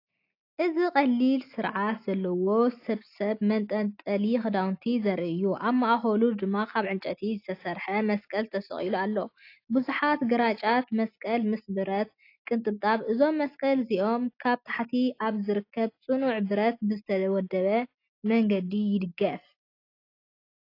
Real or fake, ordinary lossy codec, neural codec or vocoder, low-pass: real; AAC, 32 kbps; none; 5.4 kHz